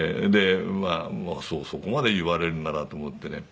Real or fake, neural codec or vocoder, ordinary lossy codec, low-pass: real; none; none; none